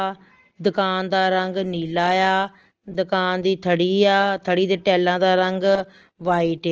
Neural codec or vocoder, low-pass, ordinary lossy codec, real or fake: none; 7.2 kHz; Opus, 16 kbps; real